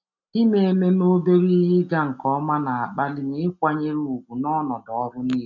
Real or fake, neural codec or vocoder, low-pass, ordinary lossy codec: real; none; 7.2 kHz; none